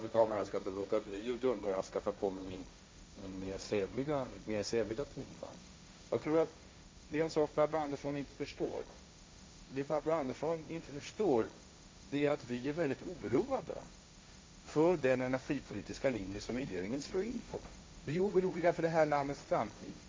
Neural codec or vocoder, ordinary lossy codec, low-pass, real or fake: codec, 16 kHz, 1.1 kbps, Voila-Tokenizer; none; none; fake